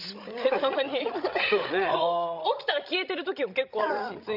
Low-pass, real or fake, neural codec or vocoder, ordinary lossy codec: 5.4 kHz; fake; codec, 16 kHz, 16 kbps, FreqCodec, larger model; none